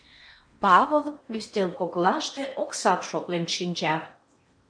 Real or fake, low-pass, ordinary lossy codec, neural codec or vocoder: fake; 9.9 kHz; MP3, 48 kbps; codec, 16 kHz in and 24 kHz out, 0.8 kbps, FocalCodec, streaming, 65536 codes